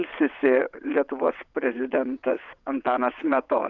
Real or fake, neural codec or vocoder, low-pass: fake; vocoder, 22.05 kHz, 80 mel bands, WaveNeXt; 7.2 kHz